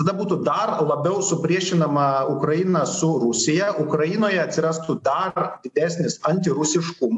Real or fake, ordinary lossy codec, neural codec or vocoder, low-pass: real; AAC, 64 kbps; none; 10.8 kHz